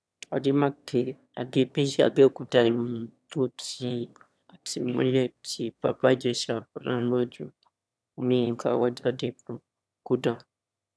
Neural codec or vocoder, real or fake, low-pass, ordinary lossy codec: autoencoder, 22.05 kHz, a latent of 192 numbers a frame, VITS, trained on one speaker; fake; none; none